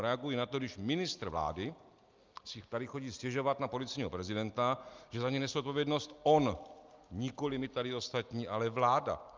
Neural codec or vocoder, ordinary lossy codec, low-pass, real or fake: none; Opus, 24 kbps; 7.2 kHz; real